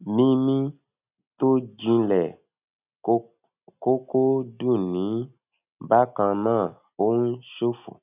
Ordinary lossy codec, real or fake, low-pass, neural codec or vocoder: none; real; 3.6 kHz; none